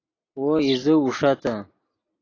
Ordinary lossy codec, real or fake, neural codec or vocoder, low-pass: AAC, 32 kbps; real; none; 7.2 kHz